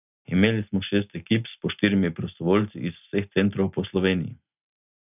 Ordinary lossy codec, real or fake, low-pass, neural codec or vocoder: none; real; 3.6 kHz; none